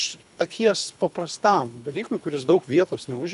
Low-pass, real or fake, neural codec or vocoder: 10.8 kHz; fake; codec, 24 kHz, 3 kbps, HILCodec